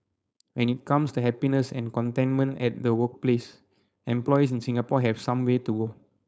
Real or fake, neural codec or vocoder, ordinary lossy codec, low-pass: fake; codec, 16 kHz, 4.8 kbps, FACodec; none; none